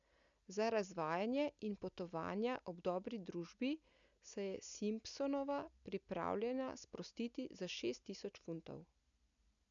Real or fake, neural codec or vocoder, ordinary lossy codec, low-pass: real; none; none; 7.2 kHz